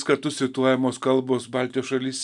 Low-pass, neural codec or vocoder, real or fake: 10.8 kHz; none; real